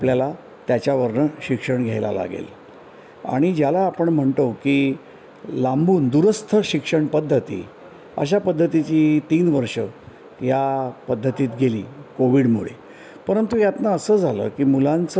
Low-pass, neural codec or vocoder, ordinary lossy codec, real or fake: none; none; none; real